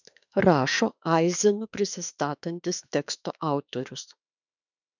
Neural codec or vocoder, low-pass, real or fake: autoencoder, 48 kHz, 32 numbers a frame, DAC-VAE, trained on Japanese speech; 7.2 kHz; fake